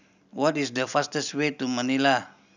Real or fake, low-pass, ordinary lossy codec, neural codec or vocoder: real; 7.2 kHz; none; none